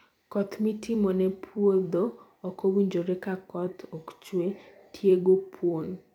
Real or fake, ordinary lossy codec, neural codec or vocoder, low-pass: fake; none; vocoder, 44.1 kHz, 128 mel bands every 256 samples, BigVGAN v2; 19.8 kHz